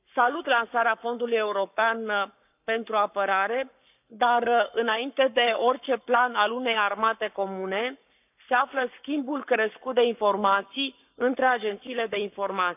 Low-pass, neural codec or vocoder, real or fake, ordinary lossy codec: 3.6 kHz; codec, 44.1 kHz, 7.8 kbps, Pupu-Codec; fake; none